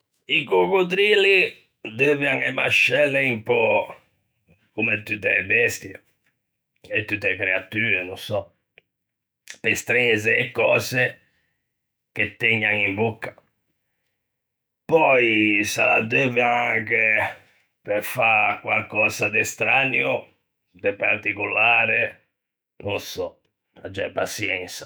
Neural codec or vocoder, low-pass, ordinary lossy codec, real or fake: autoencoder, 48 kHz, 128 numbers a frame, DAC-VAE, trained on Japanese speech; none; none; fake